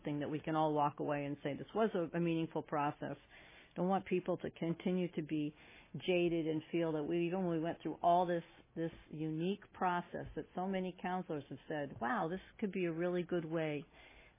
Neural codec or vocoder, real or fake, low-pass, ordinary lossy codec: none; real; 3.6 kHz; MP3, 16 kbps